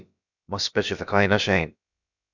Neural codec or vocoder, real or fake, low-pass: codec, 16 kHz, about 1 kbps, DyCAST, with the encoder's durations; fake; 7.2 kHz